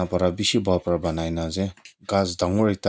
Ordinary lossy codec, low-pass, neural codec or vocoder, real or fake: none; none; none; real